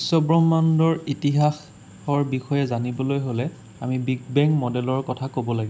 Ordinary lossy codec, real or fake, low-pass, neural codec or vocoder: none; real; none; none